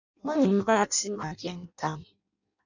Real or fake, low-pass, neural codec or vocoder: fake; 7.2 kHz; codec, 16 kHz in and 24 kHz out, 0.6 kbps, FireRedTTS-2 codec